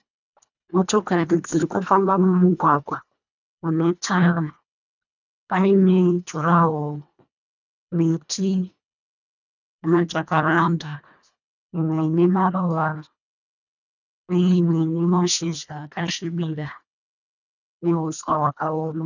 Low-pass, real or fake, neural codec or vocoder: 7.2 kHz; fake; codec, 24 kHz, 1.5 kbps, HILCodec